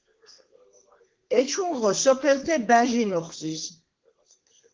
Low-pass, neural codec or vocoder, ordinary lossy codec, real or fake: 7.2 kHz; codec, 32 kHz, 1.9 kbps, SNAC; Opus, 16 kbps; fake